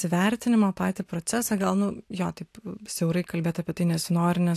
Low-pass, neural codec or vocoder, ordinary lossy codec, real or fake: 14.4 kHz; none; AAC, 64 kbps; real